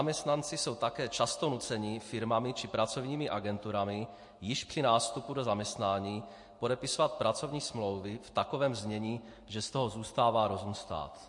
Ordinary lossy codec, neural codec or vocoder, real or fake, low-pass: MP3, 48 kbps; none; real; 10.8 kHz